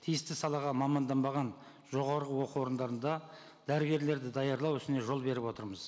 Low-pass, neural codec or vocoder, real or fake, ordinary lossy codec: none; none; real; none